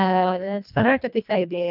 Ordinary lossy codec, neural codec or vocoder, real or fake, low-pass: none; codec, 24 kHz, 1.5 kbps, HILCodec; fake; 5.4 kHz